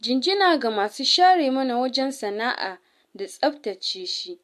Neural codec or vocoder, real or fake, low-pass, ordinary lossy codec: none; real; 14.4 kHz; MP3, 64 kbps